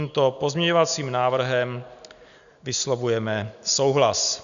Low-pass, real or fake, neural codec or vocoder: 7.2 kHz; real; none